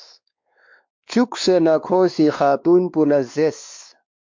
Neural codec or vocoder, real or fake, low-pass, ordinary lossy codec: codec, 16 kHz, 2 kbps, X-Codec, WavLM features, trained on Multilingual LibriSpeech; fake; 7.2 kHz; MP3, 64 kbps